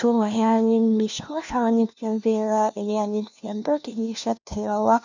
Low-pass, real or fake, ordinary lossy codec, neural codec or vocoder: 7.2 kHz; fake; none; codec, 16 kHz, 1 kbps, FunCodec, trained on LibriTTS, 50 frames a second